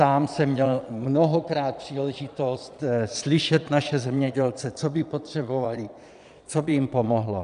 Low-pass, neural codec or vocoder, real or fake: 9.9 kHz; vocoder, 22.05 kHz, 80 mel bands, Vocos; fake